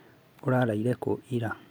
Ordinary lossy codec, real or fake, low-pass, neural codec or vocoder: none; real; none; none